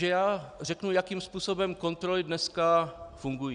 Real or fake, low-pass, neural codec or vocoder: real; 9.9 kHz; none